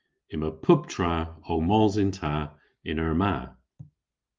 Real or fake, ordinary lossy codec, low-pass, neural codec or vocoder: real; Opus, 32 kbps; 7.2 kHz; none